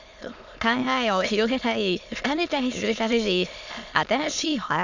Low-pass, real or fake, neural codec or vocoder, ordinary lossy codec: 7.2 kHz; fake; autoencoder, 22.05 kHz, a latent of 192 numbers a frame, VITS, trained on many speakers; none